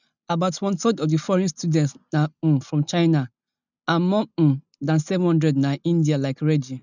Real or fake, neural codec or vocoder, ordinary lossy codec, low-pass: real; none; none; 7.2 kHz